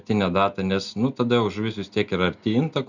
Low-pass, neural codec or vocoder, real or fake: 7.2 kHz; none; real